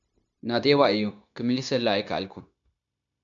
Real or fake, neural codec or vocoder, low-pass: fake; codec, 16 kHz, 0.9 kbps, LongCat-Audio-Codec; 7.2 kHz